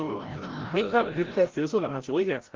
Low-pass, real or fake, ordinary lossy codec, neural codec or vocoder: 7.2 kHz; fake; Opus, 16 kbps; codec, 16 kHz, 0.5 kbps, FreqCodec, larger model